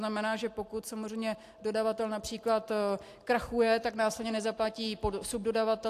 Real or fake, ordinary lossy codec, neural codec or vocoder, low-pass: real; AAC, 64 kbps; none; 14.4 kHz